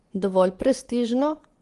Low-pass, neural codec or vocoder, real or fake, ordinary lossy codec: 10.8 kHz; vocoder, 24 kHz, 100 mel bands, Vocos; fake; Opus, 24 kbps